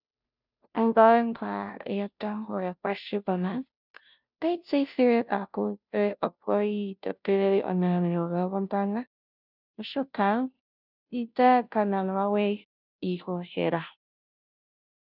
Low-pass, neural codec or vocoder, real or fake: 5.4 kHz; codec, 16 kHz, 0.5 kbps, FunCodec, trained on Chinese and English, 25 frames a second; fake